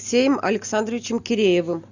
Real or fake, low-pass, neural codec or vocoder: real; 7.2 kHz; none